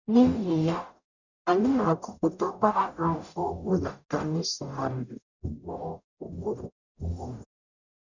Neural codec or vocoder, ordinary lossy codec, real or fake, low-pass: codec, 44.1 kHz, 0.9 kbps, DAC; none; fake; 7.2 kHz